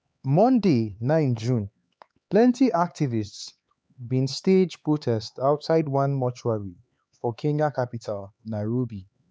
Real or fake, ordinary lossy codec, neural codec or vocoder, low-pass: fake; none; codec, 16 kHz, 4 kbps, X-Codec, HuBERT features, trained on LibriSpeech; none